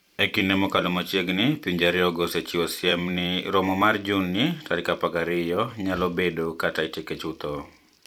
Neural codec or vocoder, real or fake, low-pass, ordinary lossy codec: vocoder, 44.1 kHz, 128 mel bands every 256 samples, BigVGAN v2; fake; 19.8 kHz; none